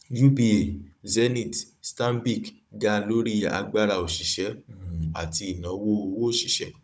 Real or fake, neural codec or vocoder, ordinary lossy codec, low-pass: fake; codec, 16 kHz, 16 kbps, FunCodec, trained on Chinese and English, 50 frames a second; none; none